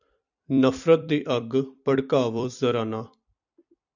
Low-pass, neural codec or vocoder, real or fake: 7.2 kHz; vocoder, 44.1 kHz, 128 mel bands every 256 samples, BigVGAN v2; fake